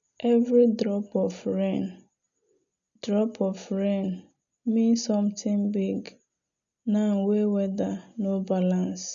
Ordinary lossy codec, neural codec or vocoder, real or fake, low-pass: none; none; real; 7.2 kHz